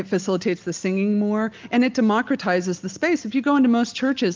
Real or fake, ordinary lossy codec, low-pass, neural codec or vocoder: real; Opus, 32 kbps; 7.2 kHz; none